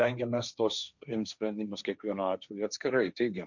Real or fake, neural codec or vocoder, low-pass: fake; codec, 16 kHz, 1.1 kbps, Voila-Tokenizer; 7.2 kHz